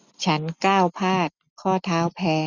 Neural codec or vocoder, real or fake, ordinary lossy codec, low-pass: none; real; none; 7.2 kHz